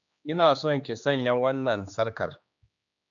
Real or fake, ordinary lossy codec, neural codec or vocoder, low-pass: fake; MP3, 96 kbps; codec, 16 kHz, 2 kbps, X-Codec, HuBERT features, trained on general audio; 7.2 kHz